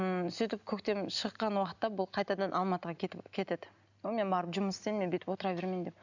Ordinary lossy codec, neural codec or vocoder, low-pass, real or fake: none; none; 7.2 kHz; real